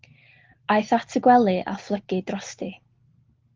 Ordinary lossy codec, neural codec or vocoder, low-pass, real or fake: Opus, 16 kbps; none; 7.2 kHz; real